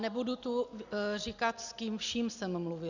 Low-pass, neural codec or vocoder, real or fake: 7.2 kHz; none; real